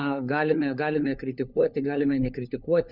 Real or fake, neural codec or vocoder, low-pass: fake; codec, 16 kHz, 4 kbps, FunCodec, trained on LibriTTS, 50 frames a second; 5.4 kHz